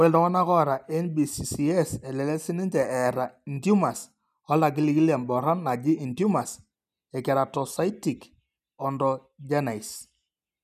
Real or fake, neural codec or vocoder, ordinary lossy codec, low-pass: real; none; none; 14.4 kHz